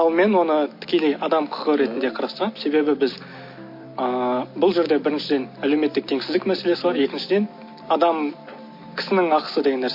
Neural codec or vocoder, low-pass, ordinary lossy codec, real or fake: none; 5.4 kHz; MP3, 32 kbps; real